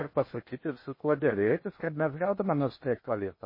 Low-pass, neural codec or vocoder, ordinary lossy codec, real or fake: 5.4 kHz; codec, 16 kHz in and 24 kHz out, 0.6 kbps, FocalCodec, streaming, 4096 codes; MP3, 24 kbps; fake